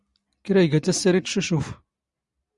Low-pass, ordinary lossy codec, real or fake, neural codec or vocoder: 10.8 kHz; Opus, 64 kbps; real; none